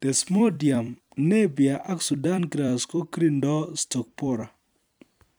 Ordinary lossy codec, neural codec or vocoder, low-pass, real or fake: none; vocoder, 44.1 kHz, 128 mel bands every 256 samples, BigVGAN v2; none; fake